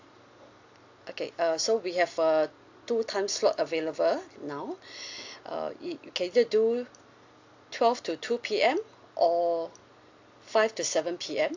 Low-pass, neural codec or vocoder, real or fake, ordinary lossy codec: 7.2 kHz; none; real; AAC, 48 kbps